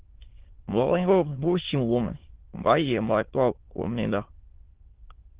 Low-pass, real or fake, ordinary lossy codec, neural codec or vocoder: 3.6 kHz; fake; Opus, 16 kbps; autoencoder, 22.05 kHz, a latent of 192 numbers a frame, VITS, trained on many speakers